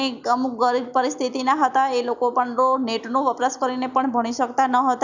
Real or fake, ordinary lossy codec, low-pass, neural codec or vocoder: real; none; 7.2 kHz; none